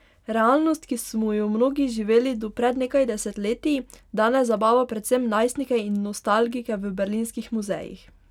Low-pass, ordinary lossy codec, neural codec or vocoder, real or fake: 19.8 kHz; none; none; real